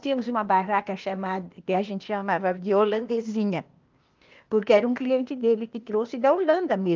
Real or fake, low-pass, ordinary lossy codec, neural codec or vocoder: fake; 7.2 kHz; Opus, 24 kbps; codec, 16 kHz, 0.8 kbps, ZipCodec